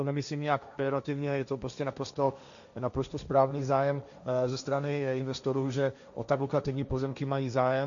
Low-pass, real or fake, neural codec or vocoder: 7.2 kHz; fake; codec, 16 kHz, 1.1 kbps, Voila-Tokenizer